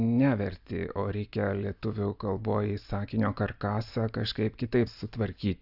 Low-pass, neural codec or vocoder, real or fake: 5.4 kHz; none; real